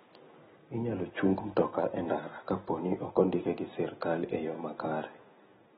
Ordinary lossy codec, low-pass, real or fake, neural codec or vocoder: AAC, 16 kbps; 7.2 kHz; real; none